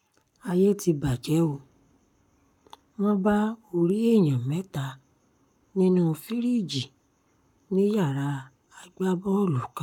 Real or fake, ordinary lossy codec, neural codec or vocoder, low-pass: fake; none; codec, 44.1 kHz, 7.8 kbps, Pupu-Codec; 19.8 kHz